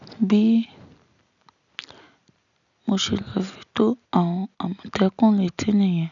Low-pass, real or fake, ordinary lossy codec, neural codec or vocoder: 7.2 kHz; real; none; none